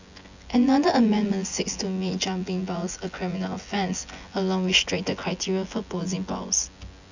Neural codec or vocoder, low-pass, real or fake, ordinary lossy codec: vocoder, 24 kHz, 100 mel bands, Vocos; 7.2 kHz; fake; none